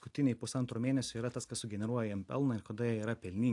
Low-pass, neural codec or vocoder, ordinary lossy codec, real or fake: 10.8 kHz; none; AAC, 64 kbps; real